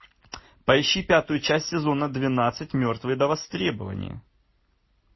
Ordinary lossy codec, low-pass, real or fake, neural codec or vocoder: MP3, 24 kbps; 7.2 kHz; real; none